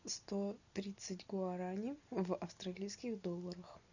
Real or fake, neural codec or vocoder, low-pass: real; none; 7.2 kHz